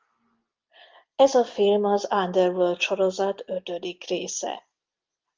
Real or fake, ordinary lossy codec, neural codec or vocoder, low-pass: real; Opus, 24 kbps; none; 7.2 kHz